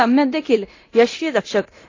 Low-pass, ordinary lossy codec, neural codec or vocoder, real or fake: 7.2 kHz; AAC, 32 kbps; codec, 24 kHz, 0.9 kbps, DualCodec; fake